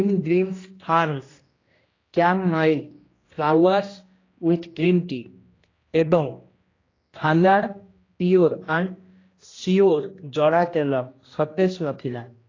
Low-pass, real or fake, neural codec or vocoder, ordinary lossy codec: 7.2 kHz; fake; codec, 16 kHz, 1 kbps, X-Codec, HuBERT features, trained on general audio; AAC, 32 kbps